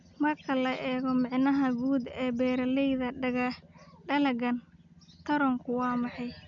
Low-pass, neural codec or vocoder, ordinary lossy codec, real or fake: 7.2 kHz; none; none; real